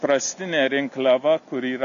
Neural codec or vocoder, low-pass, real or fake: none; 7.2 kHz; real